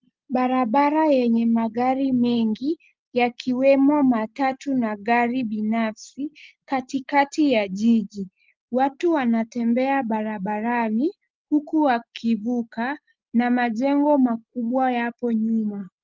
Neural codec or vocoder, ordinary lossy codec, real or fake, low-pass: none; Opus, 32 kbps; real; 7.2 kHz